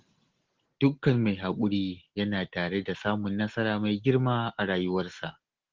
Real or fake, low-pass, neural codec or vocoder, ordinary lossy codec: real; 7.2 kHz; none; Opus, 16 kbps